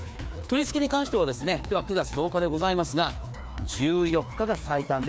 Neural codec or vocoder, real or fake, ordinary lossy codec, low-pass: codec, 16 kHz, 2 kbps, FreqCodec, larger model; fake; none; none